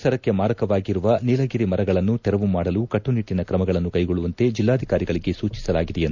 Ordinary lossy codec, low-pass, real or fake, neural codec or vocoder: none; 7.2 kHz; real; none